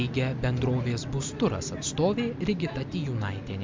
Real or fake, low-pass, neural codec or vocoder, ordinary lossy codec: real; 7.2 kHz; none; MP3, 64 kbps